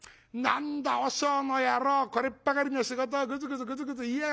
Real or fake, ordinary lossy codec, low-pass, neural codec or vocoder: real; none; none; none